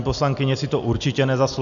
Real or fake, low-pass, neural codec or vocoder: real; 7.2 kHz; none